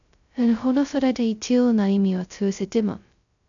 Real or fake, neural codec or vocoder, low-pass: fake; codec, 16 kHz, 0.2 kbps, FocalCodec; 7.2 kHz